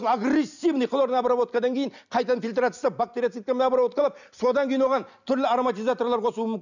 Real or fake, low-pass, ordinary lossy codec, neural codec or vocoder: real; 7.2 kHz; none; none